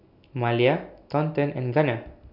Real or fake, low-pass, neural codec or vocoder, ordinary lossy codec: real; 5.4 kHz; none; none